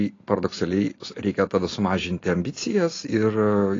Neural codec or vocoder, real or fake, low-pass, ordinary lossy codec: none; real; 7.2 kHz; AAC, 32 kbps